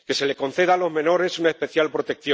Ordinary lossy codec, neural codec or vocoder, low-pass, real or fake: none; none; none; real